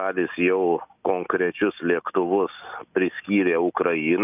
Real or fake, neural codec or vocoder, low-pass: real; none; 3.6 kHz